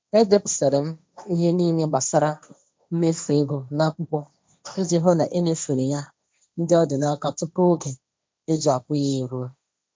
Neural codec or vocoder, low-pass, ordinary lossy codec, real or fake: codec, 16 kHz, 1.1 kbps, Voila-Tokenizer; none; none; fake